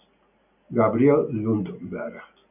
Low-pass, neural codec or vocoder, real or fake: 3.6 kHz; none; real